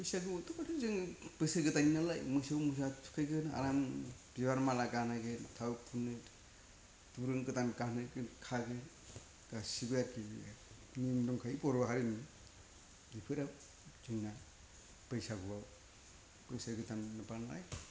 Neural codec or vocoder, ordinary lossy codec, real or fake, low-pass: none; none; real; none